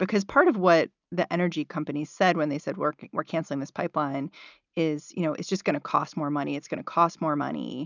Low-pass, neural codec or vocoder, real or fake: 7.2 kHz; none; real